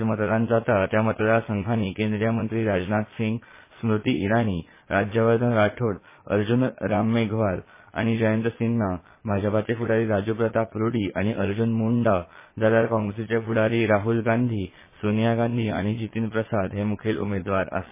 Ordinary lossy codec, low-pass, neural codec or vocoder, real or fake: MP3, 16 kbps; 3.6 kHz; vocoder, 22.05 kHz, 80 mel bands, Vocos; fake